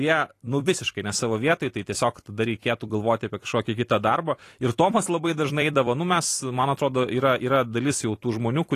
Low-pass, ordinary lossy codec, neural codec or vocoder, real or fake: 14.4 kHz; AAC, 48 kbps; vocoder, 44.1 kHz, 128 mel bands every 256 samples, BigVGAN v2; fake